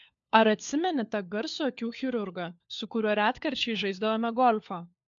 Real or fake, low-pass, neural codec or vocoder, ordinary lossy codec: fake; 7.2 kHz; codec, 16 kHz, 4 kbps, FunCodec, trained on LibriTTS, 50 frames a second; MP3, 64 kbps